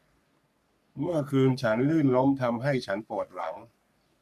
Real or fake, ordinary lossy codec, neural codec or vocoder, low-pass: fake; none; codec, 44.1 kHz, 3.4 kbps, Pupu-Codec; 14.4 kHz